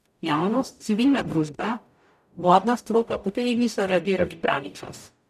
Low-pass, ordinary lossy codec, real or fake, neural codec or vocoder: 14.4 kHz; none; fake; codec, 44.1 kHz, 0.9 kbps, DAC